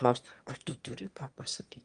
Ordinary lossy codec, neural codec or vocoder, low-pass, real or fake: Opus, 32 kbps; autoencoder, 22.05 kHz, a latent of 192 numbers a frame, VITS, trained on one speaker; 9.9 kHz; fake